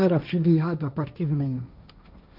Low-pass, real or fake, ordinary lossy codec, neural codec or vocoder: 5.4 kHz; fake; none; codec, 16 kHz, 1.1 kbps, Voila-Tokenizer